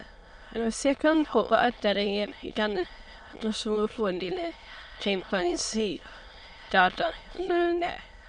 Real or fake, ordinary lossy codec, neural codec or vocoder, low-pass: fake; none; autoencoder, 22.05 kHz, a latent of 192 numbers a frame, VITS, trained on many speakers; 9.9 kHz